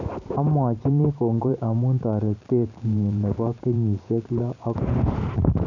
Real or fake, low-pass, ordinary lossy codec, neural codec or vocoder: fake; 7.2 kHz; none; vocoder, 44.1 kHz, 128 mel bands every 512 samples, BigVGAN v2